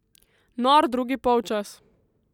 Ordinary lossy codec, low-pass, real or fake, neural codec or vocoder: none; 19.8 kHz; real; none